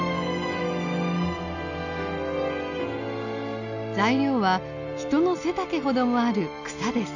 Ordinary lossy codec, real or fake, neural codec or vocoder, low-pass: none; real; none; 7.2 kHz